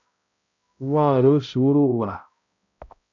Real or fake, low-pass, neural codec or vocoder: fake; 7.2 kHz; codec, 16 kHz, 0.5 kbps, X-Codec, HuBERT features, trained on balanced general audio